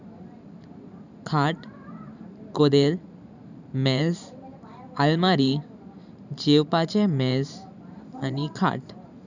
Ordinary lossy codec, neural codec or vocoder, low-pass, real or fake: none; vocoder, 44.1 kHz, 128 mel bands every 512 samples, BigVGAN v2; 7.2 kHz; fake